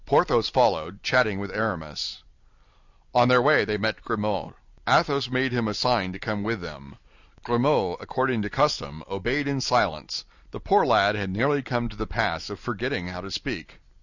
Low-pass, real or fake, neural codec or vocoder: 7.2 kHz; real; none